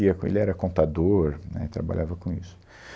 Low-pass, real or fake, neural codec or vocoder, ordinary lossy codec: none; real; none; none